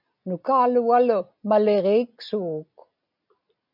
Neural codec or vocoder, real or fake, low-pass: none; real; 5.4 kHz